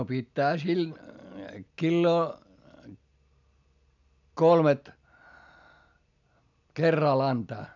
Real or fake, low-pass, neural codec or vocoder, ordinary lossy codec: real; 7.2 kHz; none; none